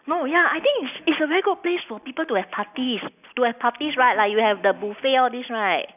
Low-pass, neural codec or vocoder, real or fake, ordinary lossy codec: 3.6 kHz; none; real; none